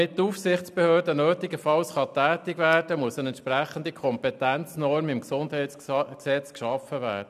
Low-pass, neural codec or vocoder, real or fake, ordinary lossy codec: 14.4 kHz; none; real; none